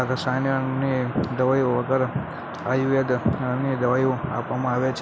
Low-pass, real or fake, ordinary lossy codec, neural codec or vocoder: none; real; none; none